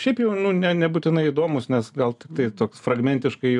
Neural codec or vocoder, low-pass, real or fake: none; 10.8 kHz; real